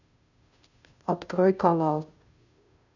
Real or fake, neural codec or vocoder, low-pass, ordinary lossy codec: fake; codec, 16 kHz, 0.5 kbps, FunCodec, trained on Chinese and English, 25 frames a second; 7.2 kHz; none